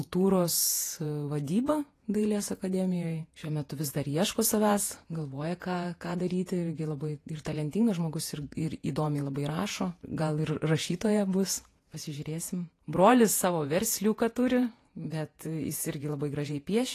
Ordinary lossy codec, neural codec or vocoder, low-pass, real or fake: AAC, 48 kbps; vocoder, 48 kHz, 128 mel bands, Vocos; 14.4 kHz; fake